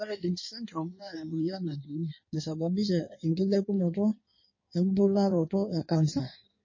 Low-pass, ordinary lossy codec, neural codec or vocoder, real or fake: 7.2 kHz; MP3, 32 kbps; codec, 16 kHz in and 24 kHz out, 1.1 kbps, FireRedTTS-2 codec; fake